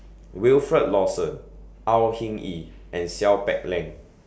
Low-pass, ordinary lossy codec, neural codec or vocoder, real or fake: none; none; none; real